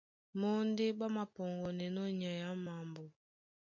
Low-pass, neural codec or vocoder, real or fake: 7.2 kHz; none; real